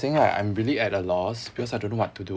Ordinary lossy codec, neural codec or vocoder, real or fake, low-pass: none; none; real; none